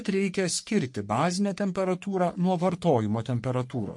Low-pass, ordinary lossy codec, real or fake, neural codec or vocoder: 10.8 kHz; MP3, 48 kbps; fake; codec, 44.1 kHz, 3.4 kbps, Pupu-Codec